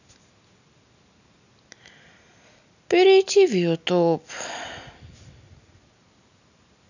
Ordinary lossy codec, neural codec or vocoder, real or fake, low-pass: none; none; real; 7.2 kHz